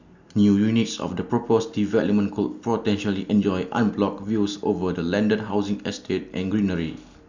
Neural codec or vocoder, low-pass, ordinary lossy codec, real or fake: none; 7.2 kHz; Opus, 64 kbps; real